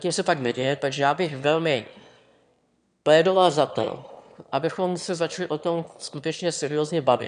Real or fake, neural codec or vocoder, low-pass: fake; autoencoder, 22.05 kHz, a latent of 192 numbers a frame, VITS, trained on one speaker; 9.9 kHz